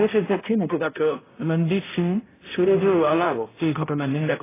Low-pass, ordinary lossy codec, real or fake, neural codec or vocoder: 3.6 kHz; AAC, 16 kbps; fake; codec, 16 kHz, 0.5 kbps, X-Codec, HuBERT features, trained on balanced general audio